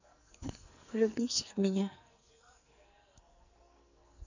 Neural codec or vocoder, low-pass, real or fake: codec, 44.1 kHz, 2.6 kbps, SNAC; 7.2 kHz; fake